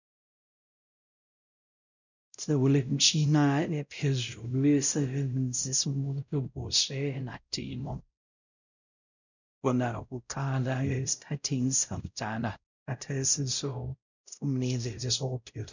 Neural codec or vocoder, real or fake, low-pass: codec, 16 kHz, 0.5 kbps, X-Codec, WavLM features, trained on Multilingual LibriSpeech; fake; 7.2 kHz